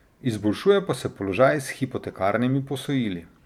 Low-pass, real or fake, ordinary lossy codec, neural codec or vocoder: 19.8 kHz; fake; none; vocoder, 44.1 kHz, 128 mel bands every 512 samples, BigVGAN v2